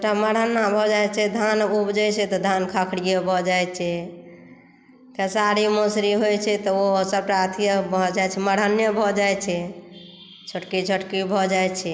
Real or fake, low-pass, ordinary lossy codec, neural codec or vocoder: real; none; none; none